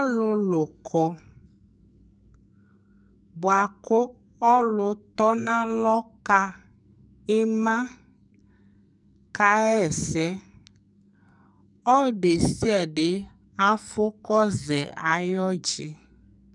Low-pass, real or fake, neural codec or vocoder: 10.8 kHz; fake; codec, 44.1 kHz, 2.6 kbps, SNAC